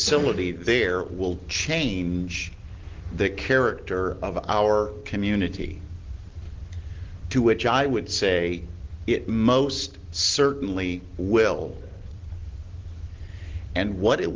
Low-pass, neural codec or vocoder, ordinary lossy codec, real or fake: 7.2 kHz; none; Opus, 16 kbps; real